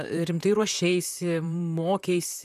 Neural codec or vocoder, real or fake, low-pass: vocoder, 44.1 kHz, 128 mel bands, Pupu-Vocoder; fake; 14.4 kHz